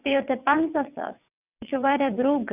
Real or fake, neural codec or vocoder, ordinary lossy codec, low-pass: fake; vocoder, 22.05 kHz, 80 mel bands, WaveNeXt; none; 3.6 kHz